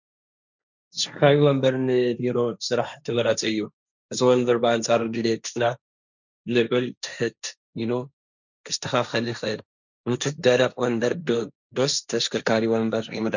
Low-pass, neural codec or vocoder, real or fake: 7.2 kHz; codec, 16 kHz, 1.1 kbps, Voila-Tokenizer; fake